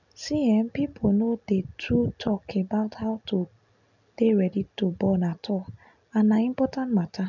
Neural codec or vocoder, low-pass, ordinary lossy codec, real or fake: none; 7.2 kHz; none; real